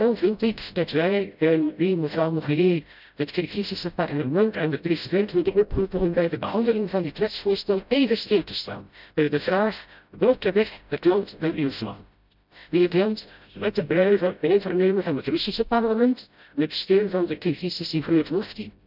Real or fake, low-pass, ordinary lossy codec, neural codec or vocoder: fake; 5.4 kHz; none; codec, 16 kHz, 0.5 kbps, FreqCodec, smaller model